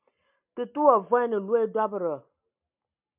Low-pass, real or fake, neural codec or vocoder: 3.6 kHz; real; none